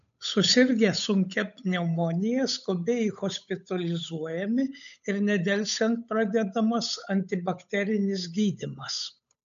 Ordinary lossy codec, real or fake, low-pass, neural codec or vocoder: AAC, 96 kbps; fake; 7.2 kHz; codec, 16 kHz, 8 kbps, FunCodec, trained on Chinese and English, 25 frames a second